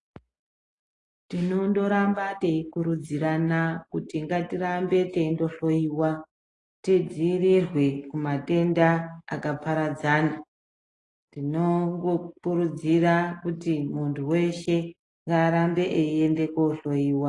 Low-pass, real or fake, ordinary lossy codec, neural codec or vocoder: 10.8 kHz; real; AAC, 32 kbps; none